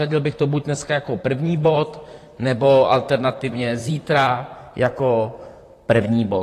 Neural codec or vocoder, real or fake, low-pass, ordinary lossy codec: vocoder, 44.1 kHz, 128 mel bands, Pupu-Vocoder; fake; 14.4 kHz; AAC, 48 kbps